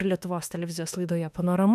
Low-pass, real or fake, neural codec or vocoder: 14.4 kHz; fake; autoencoder, 48 kHz, 32 numbers a frame, DAC-VAE, trained on Japanese speech